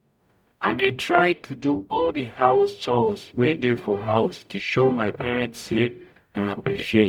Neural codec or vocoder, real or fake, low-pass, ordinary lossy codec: codec, 44.1 kHz, 0.9 kbps, DAC; fake; 19.8 kHz; none